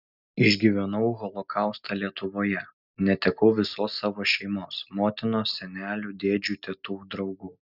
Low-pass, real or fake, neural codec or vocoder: 5.4 kHz; real; none